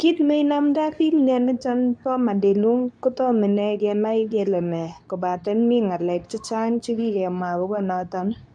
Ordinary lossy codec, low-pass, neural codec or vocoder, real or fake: none; none; codec, 24 kHz, 0.9 kbps, WavTokenizer, medium speech release version 2; fake